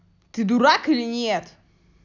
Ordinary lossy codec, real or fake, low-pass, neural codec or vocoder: none; real; 7.2 kHz; none